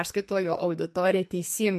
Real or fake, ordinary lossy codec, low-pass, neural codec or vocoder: fake; MP3, 64 kbps; 14.4 kHz; codec, 44.1 kHz, 2.6 kbps, SNAC